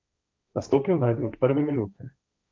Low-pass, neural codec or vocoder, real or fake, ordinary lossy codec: none; codec, 16 kHz, 1.1 kbps, Voila-Tokenizer; fake; none